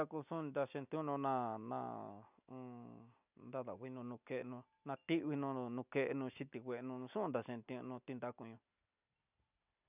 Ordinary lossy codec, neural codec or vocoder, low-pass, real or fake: none; none; 3.6 kHz; real